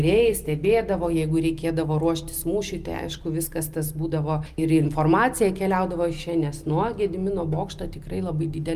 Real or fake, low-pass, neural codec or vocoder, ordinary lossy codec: real; 14.4 kHz; none; Opus, 32 kbps